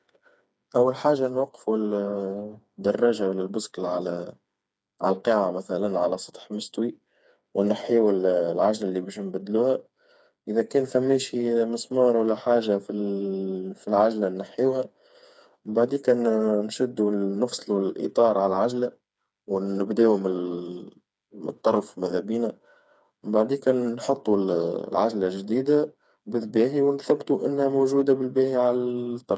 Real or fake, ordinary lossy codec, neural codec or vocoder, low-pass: fake; none; codec, 16 kHz, 4 kbps, FreqCodec, smaller model; none